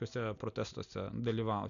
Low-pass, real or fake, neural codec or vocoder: 7.2 kHz; real; none